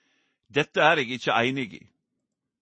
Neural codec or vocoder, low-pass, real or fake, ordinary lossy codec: none; 9.9 kHz; real; MP3, 32 kbps